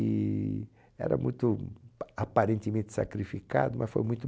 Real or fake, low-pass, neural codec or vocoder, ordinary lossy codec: real; none; none; none